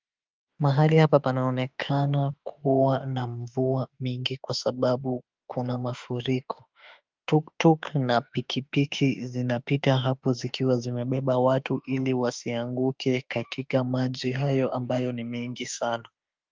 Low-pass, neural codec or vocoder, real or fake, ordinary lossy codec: 7.2 kHz; autoencoder, 48 kHz, 32 numbers a frame, DAC-VAE, trained on Japanese speech; fake; Opus, 24 kbps